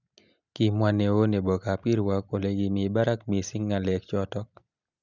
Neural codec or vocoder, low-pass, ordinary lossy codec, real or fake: none; 7.2 kHz; none; real